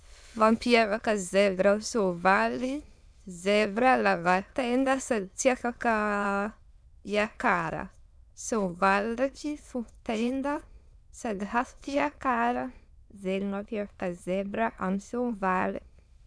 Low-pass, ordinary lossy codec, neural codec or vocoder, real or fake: none; none; autoencoder, 22.05 kHz, a latent of 192 numbers a frame, VITS, trained on many speakers; fake